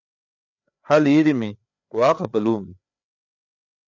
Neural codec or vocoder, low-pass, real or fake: codec, 16 kHz, 4 kbps, FreqCodec, larger model; 7.2 kHz; fake